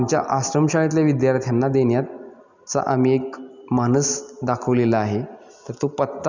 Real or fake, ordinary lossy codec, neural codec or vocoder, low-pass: real; none; none; 7.2 kHz